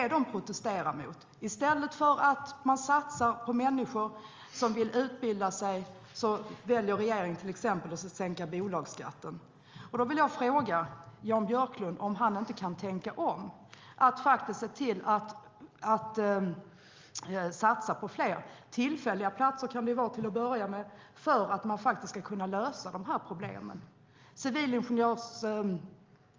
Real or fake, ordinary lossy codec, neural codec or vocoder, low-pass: real; Opus, 32 kbps; none; 7.2 kHz